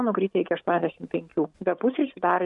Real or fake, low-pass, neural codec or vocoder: real; 10.8 kHz; none